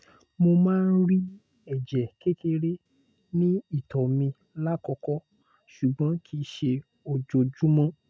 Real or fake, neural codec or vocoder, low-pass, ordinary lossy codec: real; none; none; none